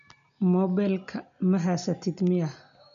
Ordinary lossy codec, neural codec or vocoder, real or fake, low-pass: none; none; real; 7.2 kHz